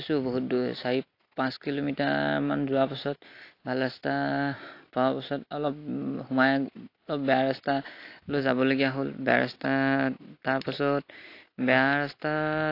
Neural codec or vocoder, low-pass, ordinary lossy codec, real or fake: none; 5.4 kHz; AAC, 32 kbps; real